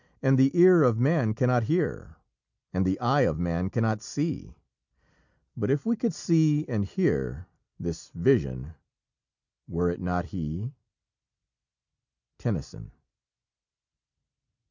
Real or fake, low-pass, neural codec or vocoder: real; 7.2 kHz; none